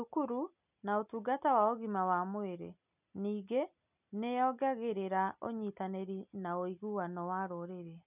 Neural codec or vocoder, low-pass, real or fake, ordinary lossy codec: none; 3.6 kHz; real; none